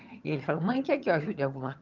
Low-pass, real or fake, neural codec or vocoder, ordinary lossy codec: 7.2 kHz; fake; vocoder, 22.05 kHz, 80 mel bands, HiFi-GAN; Opus, 32 kbps